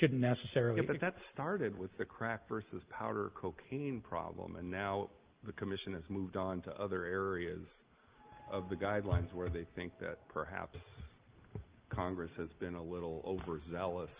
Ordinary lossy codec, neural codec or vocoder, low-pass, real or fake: Opus, 32 kbps; none; 3.6 kHz; real